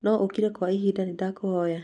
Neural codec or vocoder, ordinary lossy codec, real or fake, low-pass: none; none; real; 9.9 kHz